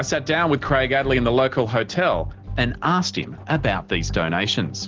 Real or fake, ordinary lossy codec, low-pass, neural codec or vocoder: real; Opus, 16 kbps; 7.2 kHz; none